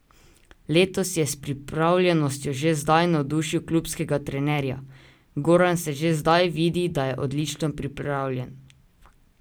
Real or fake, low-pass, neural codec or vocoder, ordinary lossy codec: real; none; none; none